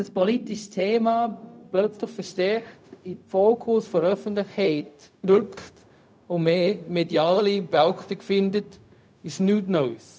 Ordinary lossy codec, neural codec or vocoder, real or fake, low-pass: none; codec, 16 kHz, 0.4 kbps, LongCat-Audio-Codec; fake; none